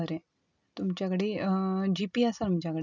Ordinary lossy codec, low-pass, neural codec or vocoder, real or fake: none; 7.2 kHz; none; real